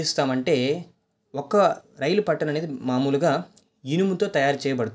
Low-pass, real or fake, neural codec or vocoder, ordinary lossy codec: none; real; none; none